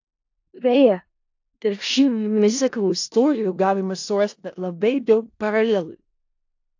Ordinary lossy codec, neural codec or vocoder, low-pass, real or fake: AAC, 48 kbps; codec, 16 kHz in and 24 kHz out, 0.4 kbps, LongCat-Audio-Codec, four codebook decoder; 7.2 kHz; fake